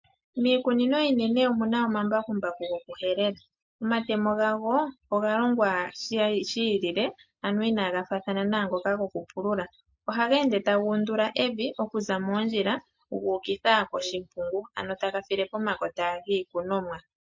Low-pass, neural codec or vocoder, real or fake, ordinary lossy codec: 7.2 kHz; none; real; MP3, 48 kbps